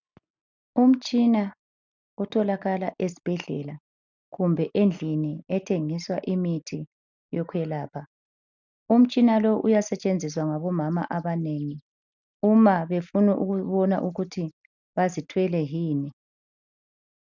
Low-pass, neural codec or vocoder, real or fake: 7.2 kHz; none; real